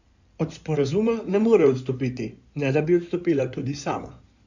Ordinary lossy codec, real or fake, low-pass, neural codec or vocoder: none; fake; 7.2 kHz; codec, 16 kHz in and 24 kHz out, 2.2 kbps, FireRedTTS-2 codec